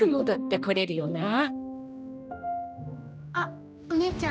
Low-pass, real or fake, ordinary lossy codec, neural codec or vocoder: none; fake; none; codec, 16 kHz, 1 kbps, X-Codec, HuBERT features, trained on general audio